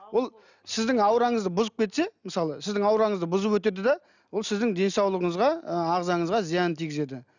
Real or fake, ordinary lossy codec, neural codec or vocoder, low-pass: real; none; none; 7.2 kHz